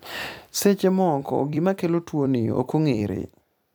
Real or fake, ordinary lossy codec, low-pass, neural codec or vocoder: fake; none; none; vocoder, 44.1 kHz, 128 mel bands every 256 samples, BigVGAN v2